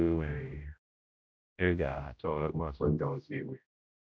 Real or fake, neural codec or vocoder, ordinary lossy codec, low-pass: fake; codec, 16 kHz, 0.5 kbps, X-Codec, HuBERT features, trained on general audio; none; none